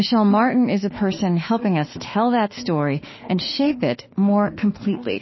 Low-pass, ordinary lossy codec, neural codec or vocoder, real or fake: 7.2 kHz; MP3, 24 kbps; autoencoder, 48 kHz, 32 numbers a frame, DAC-VAE, trained on Japanese speech; fake